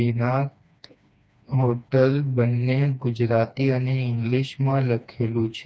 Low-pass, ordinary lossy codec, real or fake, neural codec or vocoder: none; none; fake; codec, 16 kHz, 2 kbps, FreqCodec, smaller model